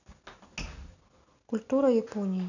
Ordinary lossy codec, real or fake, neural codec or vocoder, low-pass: none; real; none; 7.2 kHz